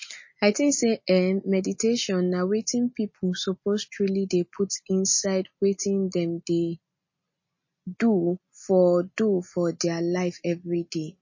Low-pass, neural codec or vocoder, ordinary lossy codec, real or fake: 7.2 kHz; none; MP3, 32 kbps; real